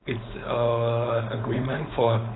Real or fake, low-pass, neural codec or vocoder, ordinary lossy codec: fake; 7.2 kHz; codec, 16 kHz, 4.8 kbps, FACodec; AAC, 16 kbps